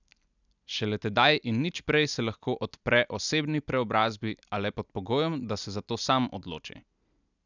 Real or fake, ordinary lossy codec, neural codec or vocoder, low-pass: real; none; none; 7.2 kHz